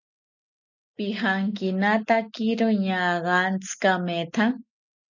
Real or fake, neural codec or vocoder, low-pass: real; none; 7.2 kHz